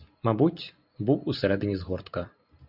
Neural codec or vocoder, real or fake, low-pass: none; real; 5.4 kHz